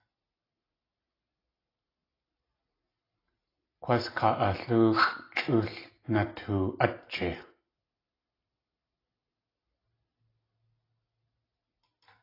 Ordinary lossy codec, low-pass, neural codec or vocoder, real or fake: AAC, 24 kbps; 5.4 kHz; none; real